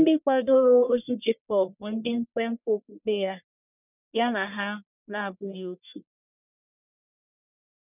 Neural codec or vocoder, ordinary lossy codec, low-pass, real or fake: codec, 44.1 kHz, 1.7 kbps, Pupu-Codec; none; 3.6 kHz; fake